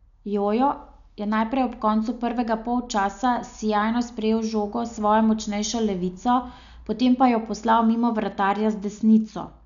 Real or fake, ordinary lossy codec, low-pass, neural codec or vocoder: real; none; 7.2 kHz; none